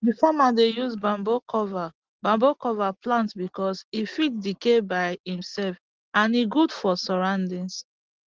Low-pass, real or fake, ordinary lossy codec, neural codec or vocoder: 7.2 kHz; real; Opus, 16 kbps; none